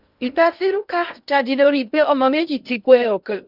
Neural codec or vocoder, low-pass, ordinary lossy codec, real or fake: codec, 16 kHz in and 24 kHz out, 0.6 kbps, FocalCodec, streaming, 2048 codes; 5.4 kHz; none; fake